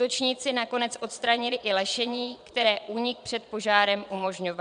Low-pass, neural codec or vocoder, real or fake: 9.9 kHz; vocoder, 22.05 kHz, 80 mel bands, Vocos; fake